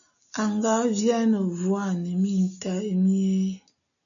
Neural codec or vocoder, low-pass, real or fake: none; 7.2 kHz; real